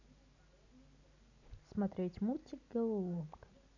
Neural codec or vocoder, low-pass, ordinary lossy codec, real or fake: none; 7.2 kHz; none; real